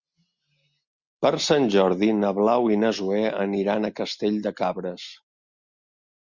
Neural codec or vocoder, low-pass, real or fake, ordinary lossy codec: none; 7.2 kHz; real; Opus, 64 kbps